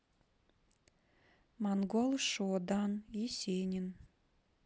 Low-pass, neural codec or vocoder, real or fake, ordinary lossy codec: none; none; real; none